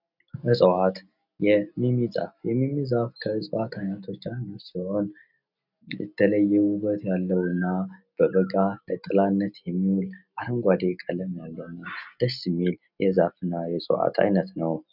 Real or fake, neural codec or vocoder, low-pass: real; none; 5.4 kHz